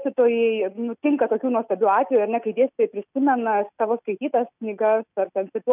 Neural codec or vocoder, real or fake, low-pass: none; real; 3.6 kHz